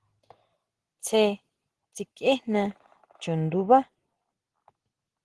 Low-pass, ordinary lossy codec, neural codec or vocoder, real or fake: 10.8 kHz; Opus, 16 kbps; none; real